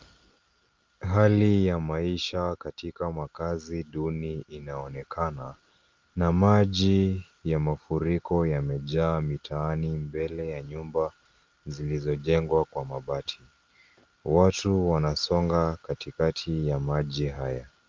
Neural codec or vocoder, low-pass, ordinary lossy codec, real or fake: none; 7.2 kHz; Opus, 32 kbps; real